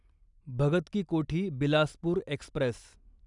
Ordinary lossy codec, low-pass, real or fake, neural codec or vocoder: none; 10.8 kHz; real; none